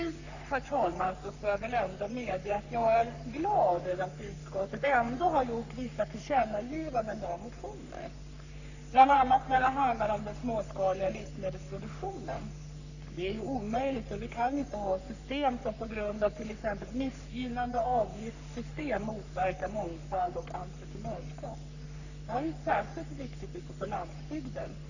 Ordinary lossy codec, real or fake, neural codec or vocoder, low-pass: none; fake; codec, 44.1 kHz, 3.4 kbps, Pupu-Codec; 7.2 kHz